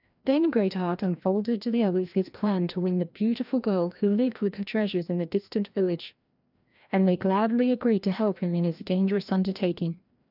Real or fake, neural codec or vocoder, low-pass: fake; codec, 16 kHz, 1 kbps, FreqCodec, larger model; 5.4 kHz